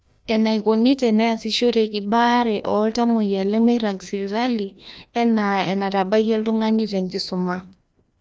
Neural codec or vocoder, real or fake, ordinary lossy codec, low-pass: codec, 16 kHz, 1 kbps, FreqCodec, larger model; fake; none; none